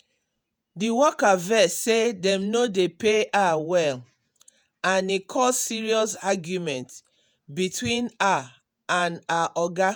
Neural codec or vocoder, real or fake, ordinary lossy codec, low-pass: vocoder, 48 kHz, 128 mel bands, Vocos; fake; none; none